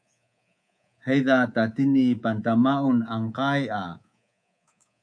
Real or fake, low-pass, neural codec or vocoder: fake; 9.9 kHz; codec, 24 kHz, 3.1 kbps, DualCodec